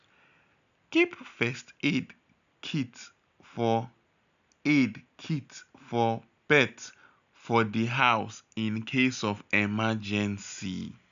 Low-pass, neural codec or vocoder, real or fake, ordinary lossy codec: 7.2 kHz; none; real; none